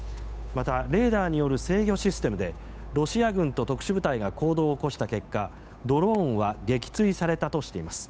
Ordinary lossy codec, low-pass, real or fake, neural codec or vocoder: none; none; fake; codec, 16 kHz, 8 kbps, FunCodec, trained on Chinese and English, 25 frames a second